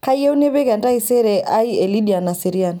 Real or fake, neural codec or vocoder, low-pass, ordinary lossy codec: real; none; none; none